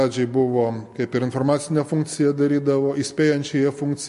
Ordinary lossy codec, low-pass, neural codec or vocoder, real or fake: MP3, 48 kbps; 14.4 kHz; none; real